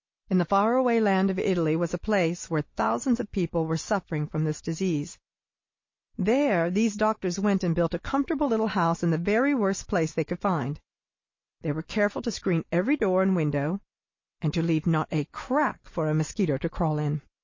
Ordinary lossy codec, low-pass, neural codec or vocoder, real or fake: MP3, 32 kbps; 7.2 kHz; none; real